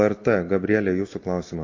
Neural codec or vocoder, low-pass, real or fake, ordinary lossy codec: none; 7.2 kHz; real; MP3, 32 kbps